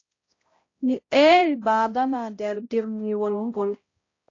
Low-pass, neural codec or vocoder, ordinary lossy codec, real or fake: 7.2 kHz; codec, 16 kHz, 0.5 kbps, X-Codec, HuBERT features, trained on balanced general audio; AAC, 32 kbps; fake